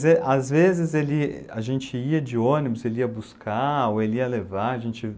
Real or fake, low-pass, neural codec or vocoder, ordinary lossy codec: real; none; none; none